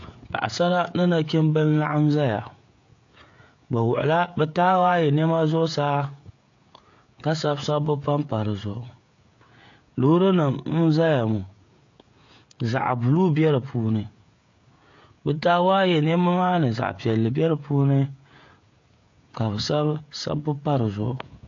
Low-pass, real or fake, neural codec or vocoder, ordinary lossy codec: 7.2 kHz; fake; codec, 16 kHz, 16 kbps, FreqCodec, smaller model; AAC, 48 kbps